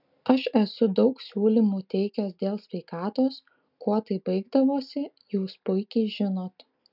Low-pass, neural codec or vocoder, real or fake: 5.4 kHz; none; real